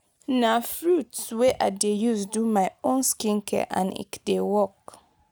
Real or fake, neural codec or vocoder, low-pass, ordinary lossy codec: real; none; none; none